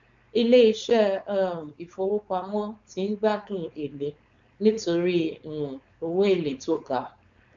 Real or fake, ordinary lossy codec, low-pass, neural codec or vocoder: fake; MP3, 64 kbps; 7.2 kHz; codec, 16 kHz, 4.8 kbps, FACodec